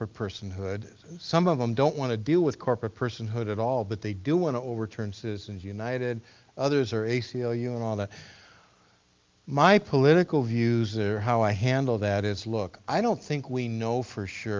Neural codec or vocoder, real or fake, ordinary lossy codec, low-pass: none; real; Opus, 24 kbps; 7.2 kHz